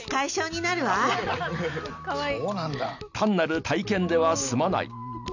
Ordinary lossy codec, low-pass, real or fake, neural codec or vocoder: none; 7.2 kHz; real; none